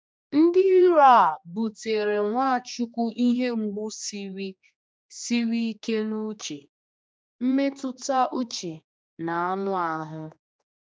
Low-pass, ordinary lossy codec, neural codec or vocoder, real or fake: none; none; codec, 16 kHz, 2 kbps, X-Codec, HuBERT features, trained on general audio; fake